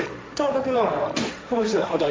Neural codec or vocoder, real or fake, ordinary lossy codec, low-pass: codec, 16 kHz, 1.1 kbps, Voila-Tokenizer; fake; none; none